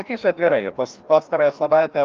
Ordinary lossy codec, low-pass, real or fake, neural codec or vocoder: Opus, 32 kbps; 7.2 kHz; fake; codec, 16 kHz, 1 kbps, FreqCodec, larger model